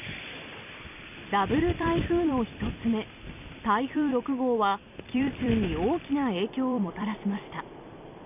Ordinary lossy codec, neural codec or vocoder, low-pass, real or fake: none; vocoder, 44.1 kHz, 128 mel bands every 256 samples, BigVGAN v2; 3.6 kHz; fake